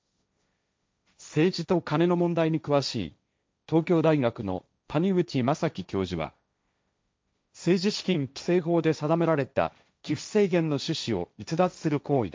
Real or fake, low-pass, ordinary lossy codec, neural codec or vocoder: fake; none; none; codec, 16 kHz, 1.1 kbps, Voila-Tokenizer